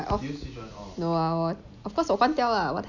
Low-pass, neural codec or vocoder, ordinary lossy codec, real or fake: 7.2 kHz; none; none; real